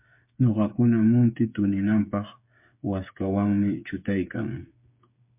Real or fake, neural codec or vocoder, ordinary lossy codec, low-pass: fake; codec, 16 kHz, 8 kbps, FreqCodec, smaller model; MP3, 32 kbps; 3.6 kHz